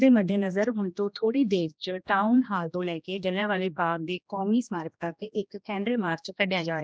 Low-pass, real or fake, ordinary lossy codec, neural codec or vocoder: none; fake; none; codec, 16 kHz, 1 kbps, X-Codec, HuBERT features, trained on general audio